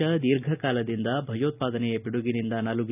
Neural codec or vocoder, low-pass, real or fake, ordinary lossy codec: none; 3.6 kHz; real; none